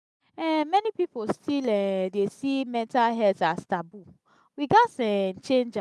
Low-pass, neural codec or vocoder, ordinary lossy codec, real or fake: none; none; none; real